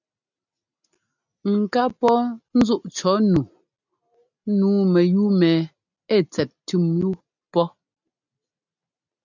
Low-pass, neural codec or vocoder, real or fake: 7.2 kHz; none; real